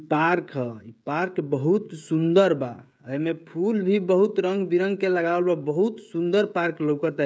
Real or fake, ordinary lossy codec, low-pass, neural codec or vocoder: fake; none; none; codec, 16 kHz, 16 kbps, FreqCodec, smaller model